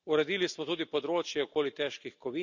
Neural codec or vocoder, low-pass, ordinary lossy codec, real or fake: none; 7.2 kHz; none; real